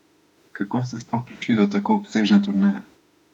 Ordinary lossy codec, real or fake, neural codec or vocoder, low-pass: none; fake; autoencoder, 48 kHz, 32 numbers a frame, DAC-VAE, trained on Japanese speech; 19.8 kHz